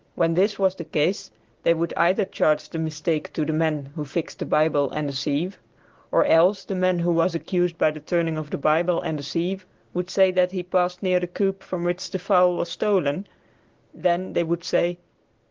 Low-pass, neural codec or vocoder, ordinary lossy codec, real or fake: 7.2 kHz; none; Opus, 16 kbps; real